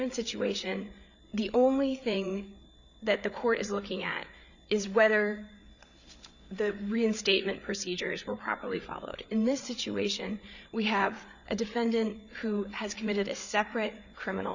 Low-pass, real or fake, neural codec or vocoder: 7.2 kHz; fake; codec, 16 kHz, 8 kbps, FreqCodec, larger model